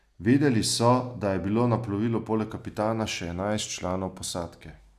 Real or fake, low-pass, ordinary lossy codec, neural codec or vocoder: real; 14.4 kHz; none; none